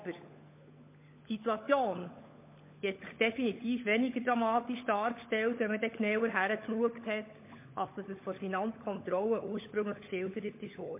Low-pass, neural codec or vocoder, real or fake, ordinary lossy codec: 3.6 kHz; codec, 16 kHz, 16 kbps, FunCodec, trained on LibriTTS, 50 frames a second; fake; MP3, 24 kbps